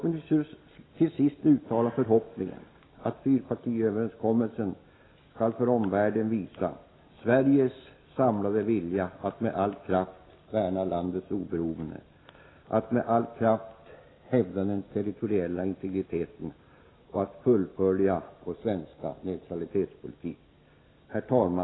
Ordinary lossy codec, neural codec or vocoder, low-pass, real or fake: AAC, 16 kbps; none; 7.2 kHz; real